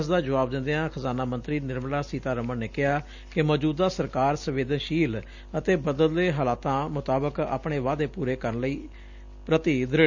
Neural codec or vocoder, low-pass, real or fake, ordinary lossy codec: none; 7.2 kHz; real; none